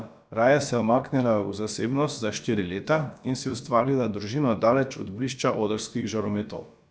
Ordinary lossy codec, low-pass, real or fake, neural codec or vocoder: none; none; fake; codec, 16 kHz, about 1 kbps, DyCAST, with the encoder's durations